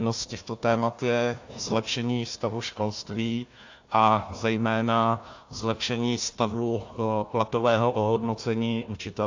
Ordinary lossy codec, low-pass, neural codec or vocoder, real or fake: AAC, 48 kbps; 7.2 kHz; codec, 16 kHz, 1 kbps, FunCodec, trained on Chinese and English, 50 frames a second; fake